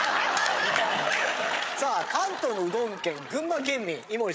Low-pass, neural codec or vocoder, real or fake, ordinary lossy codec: none; codec, 16 kHz, 8 kbps, FreqCodec, larger model; fake; none